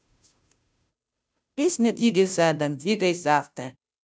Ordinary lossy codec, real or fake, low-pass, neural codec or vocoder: none; fake; none; codec, 16 kHz, 0.5 kbps, FunCodec, trained on Chinese and English, 25 frames a second